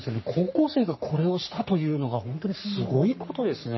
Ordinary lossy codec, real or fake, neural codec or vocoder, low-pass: MP3, 24 kbps; fake; codec, 44.1 kHz, 3.4 kbps, Pupu-Codec; 7.2 kHz